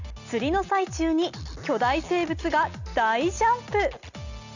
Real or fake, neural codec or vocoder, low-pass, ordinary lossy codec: real; none; 7.2 kHz; none